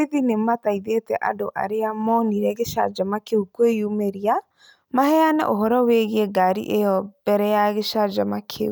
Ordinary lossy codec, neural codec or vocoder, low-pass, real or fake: none; none; none; real